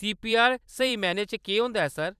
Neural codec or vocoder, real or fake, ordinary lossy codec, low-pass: vocoder, 44.1 kHz, 128 mel bands every 256 samples, BigVGAN v2; fake; none; 14.4 kHz